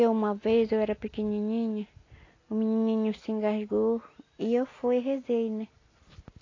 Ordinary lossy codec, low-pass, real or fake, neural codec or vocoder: AAC, 32 kbps; 7.2 kHz; real; none